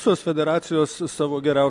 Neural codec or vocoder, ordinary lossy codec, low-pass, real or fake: none; MP3, 64 kbps; 10.8 kHz; real